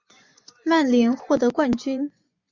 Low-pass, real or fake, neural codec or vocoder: 7.2 kHz; fake; vocoder, 24 kHz, 100 mel bands, Vocos